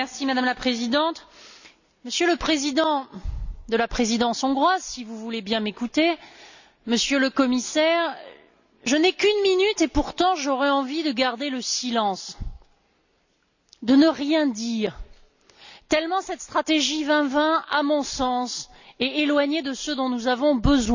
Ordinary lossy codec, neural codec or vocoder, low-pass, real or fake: none; none; 7.2 kHz; real